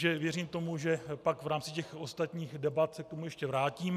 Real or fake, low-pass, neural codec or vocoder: real; 14.4 kHz; none